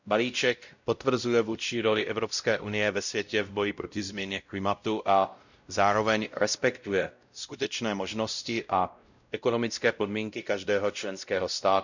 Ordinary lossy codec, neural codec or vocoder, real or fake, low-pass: none; codec, 16 kHz, 0.5 kbps, X-Codec, WavLM features, trained on Multilingual LibriSpeech; fake; 7.2 kHz